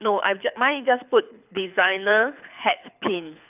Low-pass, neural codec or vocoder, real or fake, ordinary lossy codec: 3.6 kHz; codec, 24 kHz, 6 kbps, HILCodec; fake; none